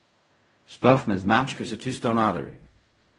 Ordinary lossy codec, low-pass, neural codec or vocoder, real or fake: AAC, 32 kbps; 10.8 kHz; codec, 16 kHz in and 24 kHz out, 0.4 kbps, LongCat-Audio-Codec, fine tuned four codebook decoder; fake